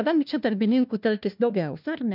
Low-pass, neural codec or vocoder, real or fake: 5.4 kHz; codec, 16 kHz, 1 kbps, FunCodec, trained on LibriTTS, 50 frames a second; fake